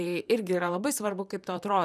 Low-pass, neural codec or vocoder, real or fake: 14.4 kHz; vocoder, 44.1 kHz, 128 mel bands, Pupu-Vocoder; fake